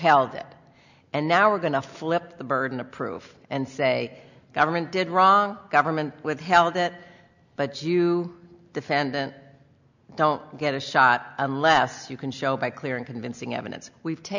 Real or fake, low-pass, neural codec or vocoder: real; 7.2 kHz; none